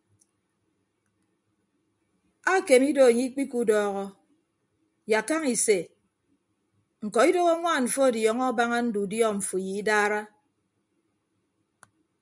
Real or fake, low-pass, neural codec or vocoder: real; 10.8 kHz; none